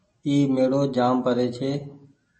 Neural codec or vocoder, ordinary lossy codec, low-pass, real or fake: none; MP3, 32 kbps; 10.8 kHz; real